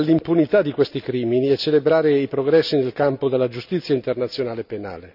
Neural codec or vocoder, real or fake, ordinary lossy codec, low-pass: none; real; none; 5.4 kHz